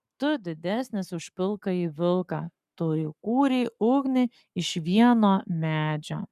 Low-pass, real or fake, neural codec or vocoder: 14.4 kHz; fake; codec, 44.1 kHz, 7.8 kbps, Pupu-Codec